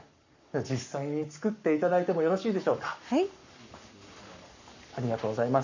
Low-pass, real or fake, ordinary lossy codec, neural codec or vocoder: 7.2 kHz; fake; none; codec, 44.1 kHz, 7.8 kbps, Pupu-Codec